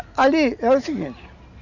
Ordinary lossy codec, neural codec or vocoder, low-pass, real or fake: none; none; 7.2 kHz; real